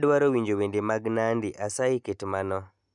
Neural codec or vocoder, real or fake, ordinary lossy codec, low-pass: none; real; none; 10.8 kHz